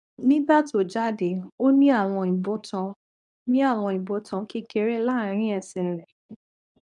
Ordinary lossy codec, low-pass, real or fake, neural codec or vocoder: none; 10.8 kHz; fake; codec, 24 kHz, 0.9 kbps, WavTokenizer, medium speech release version 2